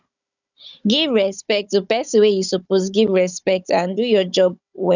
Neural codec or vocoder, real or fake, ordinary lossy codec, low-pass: codec, 16 kHz, 16 kbps, FunCodec, trained on Chinese and English, 50 frames a second; fake; none; 7.2 kHz